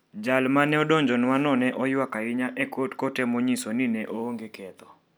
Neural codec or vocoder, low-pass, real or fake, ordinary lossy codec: none; none; real; none